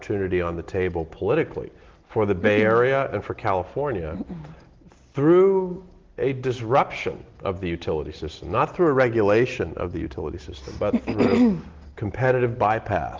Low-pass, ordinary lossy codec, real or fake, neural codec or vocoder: 7.2 kHz; Opus, 16 kbps; real; none